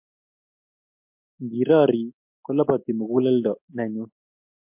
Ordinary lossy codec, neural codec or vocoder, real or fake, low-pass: MP3, 32 kbps; none; real; 3.6 kHz